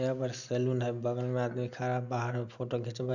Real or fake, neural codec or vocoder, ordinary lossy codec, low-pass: real; none; none; 7.2 kHz